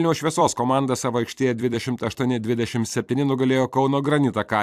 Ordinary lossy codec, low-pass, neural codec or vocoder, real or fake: AAC, 96 kbps; 14.4 kHz; vocoder, 44.1 kHz, 128 mel bands every 256 samples, BigVGAN v2; fake